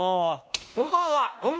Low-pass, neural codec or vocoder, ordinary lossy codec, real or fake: none; codec, 16 kHz, 1 kbps, X-Codec, WavLM features, trained on Multilingual LibriSpeech; none; fake